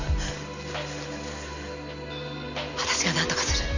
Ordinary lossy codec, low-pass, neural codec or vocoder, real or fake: none; 7.2 kHz; none; real